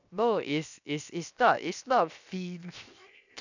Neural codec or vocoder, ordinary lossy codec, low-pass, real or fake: codec, 16 kHz, 0.7 kbps, FocalCodec; none; 7.2 kHz; fake